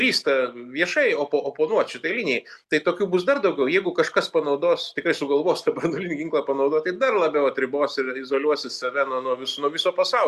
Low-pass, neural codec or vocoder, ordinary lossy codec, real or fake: 14.4 kHz; none; Opus, 64 kbps; real